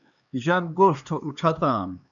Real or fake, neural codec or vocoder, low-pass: fake; codec, 16 kHz, 2 kbps, X-Codec, HuBERT features, trained on LibriSpeech; 7.2 kHz